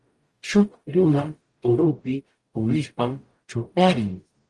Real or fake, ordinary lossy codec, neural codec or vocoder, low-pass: fake; Opus, 24 kbps; codec, 44.1 kHz, 0.9 kbps, DAC; 10.8 kHz